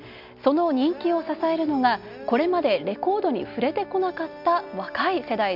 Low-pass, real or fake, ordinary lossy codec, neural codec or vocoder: 5.4 kHz; real; none; none